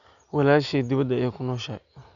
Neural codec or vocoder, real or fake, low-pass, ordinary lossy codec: none; real; 7.2 kHz; none